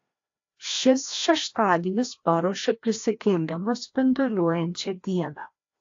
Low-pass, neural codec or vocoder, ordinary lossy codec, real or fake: 7.2 kHz; codec, 16 kHz, 1 kbps, FreqCodec, larger model; AAC, 48 kbps; fake